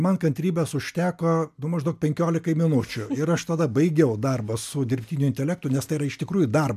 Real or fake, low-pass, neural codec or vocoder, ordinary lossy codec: real; 14.4 kHz; none; MP3, 96 kbps